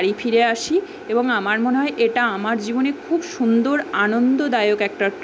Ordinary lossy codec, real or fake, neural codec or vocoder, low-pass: none; real; none; none